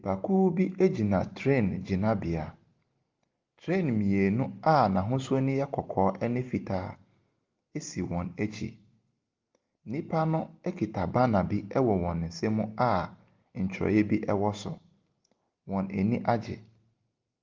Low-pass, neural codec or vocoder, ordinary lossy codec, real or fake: 7.2 kHz; none; Opus, 32 kbps; real